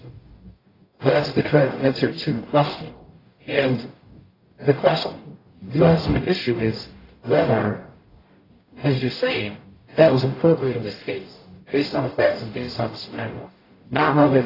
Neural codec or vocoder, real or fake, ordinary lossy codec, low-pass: codec, 44.1 kHz, 0.9 kbps, DAC; fake; AAC, 24 kbps; 5.4 kHz